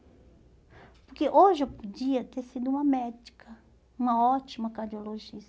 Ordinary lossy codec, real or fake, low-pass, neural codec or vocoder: none; real; none; none